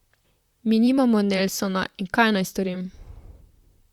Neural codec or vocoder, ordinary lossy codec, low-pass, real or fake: vocoder, 44.1 kHz, 128 mel bands, Pupu-Vocoder; Opus, 64 kbps; 19.8 kHz; fake